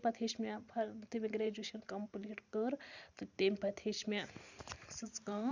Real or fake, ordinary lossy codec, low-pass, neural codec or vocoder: fake; Opus, 64 kbps; 7.2 kHz; vocoder, 44.1 kHz, 128 mel bands every 512 samples, BigVGAN v2